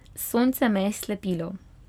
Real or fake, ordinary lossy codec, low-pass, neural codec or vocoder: real; none; 19.8 kHz; none